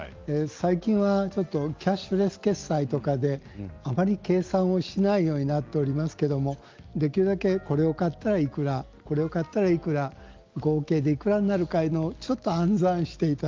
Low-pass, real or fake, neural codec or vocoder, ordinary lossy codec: 7.2 kHz; real; none; Opus, 32 kbps